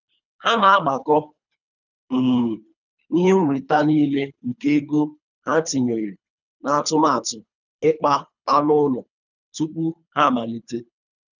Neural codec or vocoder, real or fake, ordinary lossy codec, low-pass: codec, 24 kHz, 3 kbps, HILCodec; fake; none; 7.2 kHz